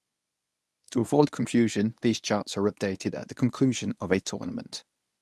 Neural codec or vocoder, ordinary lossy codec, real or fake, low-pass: codec, 24 kHz, 0.9 kbps, WavTokenizer, medium speech release version 1; none; fake; none